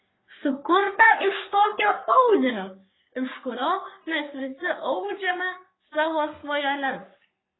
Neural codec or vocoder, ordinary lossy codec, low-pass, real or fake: codec, 44.1 kHz, 3.4 kbps, Pupu-Codec; AAC, 16 kbps; 7.2 kHz; fake